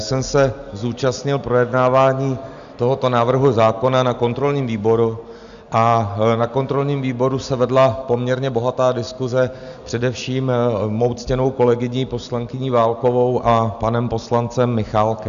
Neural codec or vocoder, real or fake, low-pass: none; real; 7.2 kHz